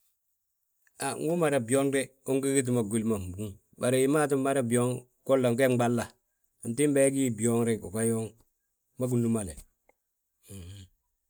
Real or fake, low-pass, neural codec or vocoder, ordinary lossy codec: real; none; none; none